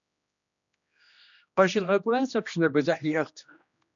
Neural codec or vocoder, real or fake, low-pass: codec, 16 kHz, 1 kbps, X-Codec, HuBERT features, trained on general audio; fake; 7.2 kHz